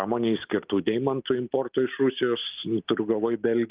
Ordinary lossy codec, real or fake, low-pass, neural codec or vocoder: Opus, 32 kbps; real; 3.6 kHz; none